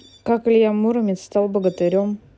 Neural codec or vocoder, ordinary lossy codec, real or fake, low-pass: none; none; real; none